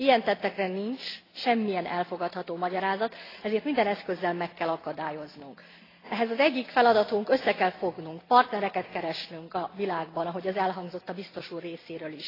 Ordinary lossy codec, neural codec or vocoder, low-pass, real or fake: AAC, 24 kbps; none; 5.4 kHz; real